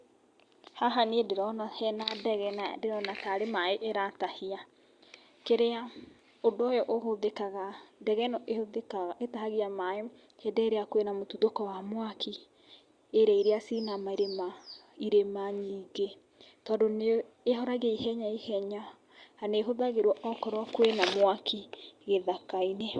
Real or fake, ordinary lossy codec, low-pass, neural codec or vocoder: real; Opus, 64 kbps; 9.9 kHz; none